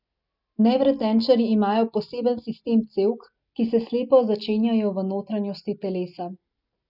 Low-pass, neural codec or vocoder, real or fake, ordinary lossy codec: 5.4 kHz; none; real; none